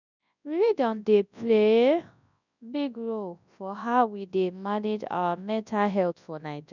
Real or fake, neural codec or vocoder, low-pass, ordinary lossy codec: fake; codec, 24 kHz, 0.9 kbps, WavTokenizer, large speech release; 7.2 kHz; none